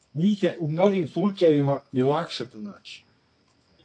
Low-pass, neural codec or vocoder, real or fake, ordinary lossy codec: 9.9 kHz; codec, 24 kHz, 0.9 kbps, WavTokenizer, medium music audio release; fake; AAC, 48 kbps